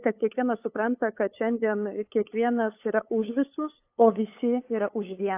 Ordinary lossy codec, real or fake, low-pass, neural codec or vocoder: AAC, 24 kbps; fake; 3.6 kHz; codec, 16 kHz, 8 kbps, FunCodec, trained on LibriTTS, 25 frames a second